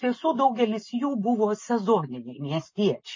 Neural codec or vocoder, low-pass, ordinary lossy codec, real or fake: none; 7.2 kHz; MP3, 32 kbps; real